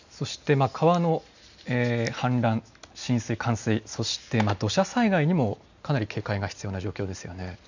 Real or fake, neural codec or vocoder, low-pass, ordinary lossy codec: real; none; 7.2 kHz; none